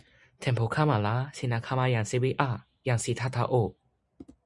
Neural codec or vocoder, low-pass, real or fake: none; 10.8 kHz; real